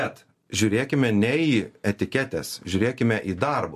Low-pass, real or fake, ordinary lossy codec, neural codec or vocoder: 14.4 kHz; real; MP3, 64 kbps; none